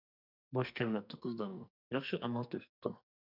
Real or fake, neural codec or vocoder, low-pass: fake; codec, 44.1 kHz, 2.6 kbps, SNAC; 5.4 kHz